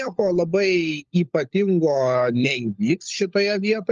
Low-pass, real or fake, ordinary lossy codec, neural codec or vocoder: 7.2 kHz; fake; Opus, 32 kbps; codec, 16 kHz, 16 kbps, FunCodec, trained on LibriTTS, 50 frames a second